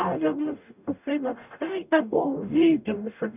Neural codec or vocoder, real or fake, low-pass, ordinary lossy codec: codec, 44.1 kHz, 0.9 kbps, DAC; fake; 3.6 kHz; none